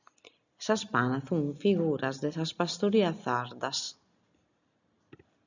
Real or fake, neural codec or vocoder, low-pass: real; none; 7.2 kHz